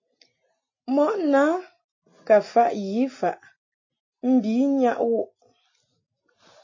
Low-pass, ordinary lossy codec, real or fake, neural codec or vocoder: 7.2 kHz; MP3, 32 kbps; real; none